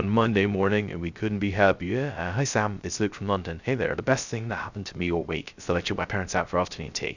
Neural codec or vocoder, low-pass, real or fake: codec, 16 kHz, 0.3 kbps, FocalCodec; 7.2 kHz; fake